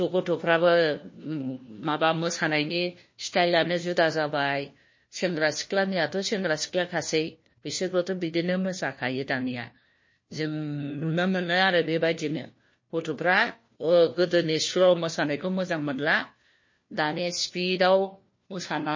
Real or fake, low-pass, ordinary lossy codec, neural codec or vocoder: fake; 7.2 kHz; MP3, 32 kbps; codec, 16 kHz, 1 kbps, FunCodec, trained on LibriTTS, 50 frames a second